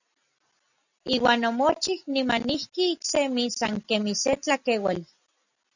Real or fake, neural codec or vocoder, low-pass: real; none; 7.2 kHz